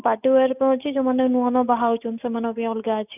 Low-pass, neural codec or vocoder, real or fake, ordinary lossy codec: 3.6 kHz; none; real; none